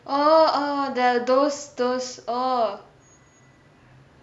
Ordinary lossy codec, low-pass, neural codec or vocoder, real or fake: none; none; none; real